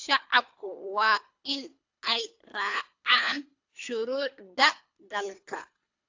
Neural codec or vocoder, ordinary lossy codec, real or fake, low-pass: codec, 24 kHz, 3 kbps, HILCodec; AAC, 48 kbps; fake; 7.2 kHz